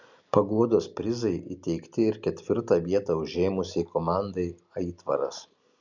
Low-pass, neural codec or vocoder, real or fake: 7.2 kHz; none; real